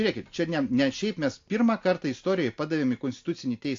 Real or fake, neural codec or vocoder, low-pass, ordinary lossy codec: real; none; 7.2 kHz; AAC, 48 kbps